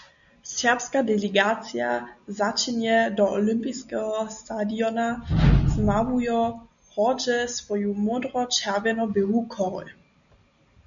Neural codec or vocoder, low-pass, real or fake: none; 7.2 kHz; real